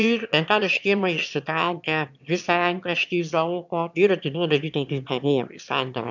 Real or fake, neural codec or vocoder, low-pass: fake; autoencoder, 22.05 kHz, a latent of 192 numbers a frame, VITS, trained on one speaker; 7.2 kHz